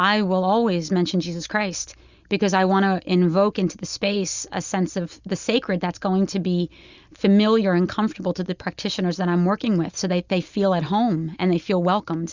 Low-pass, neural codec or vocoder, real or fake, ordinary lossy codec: 7.2 kHz; none; real; Opus, 64 kbps